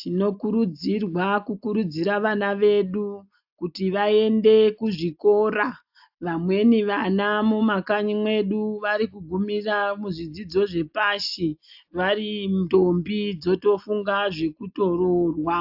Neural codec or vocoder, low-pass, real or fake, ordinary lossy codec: none; 5.4 kHz; real; AAC, 48 kbps